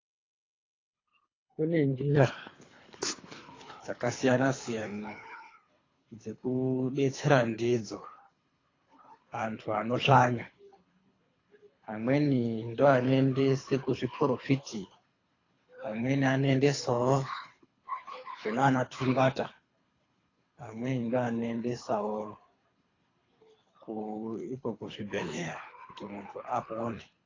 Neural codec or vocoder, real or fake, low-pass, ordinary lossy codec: codec, 24 kHz, 3 kbps, HILCodec; fake; 7.2 kHz; AAC, 32 kbps